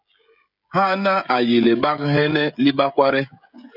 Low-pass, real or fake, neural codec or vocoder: 5.4 kHz; fake; codec, 16 kHz, 16 kbps, FreqCodec, smaller model